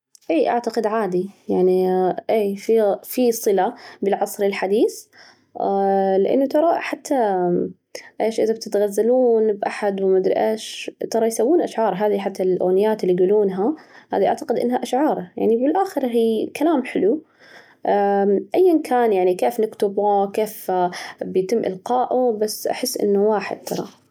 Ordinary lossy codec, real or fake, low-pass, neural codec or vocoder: none; real; 19.8 kHz; none